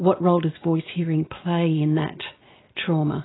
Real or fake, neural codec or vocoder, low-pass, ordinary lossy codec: real; none; 7.2 kHz; AAC, 16 kbps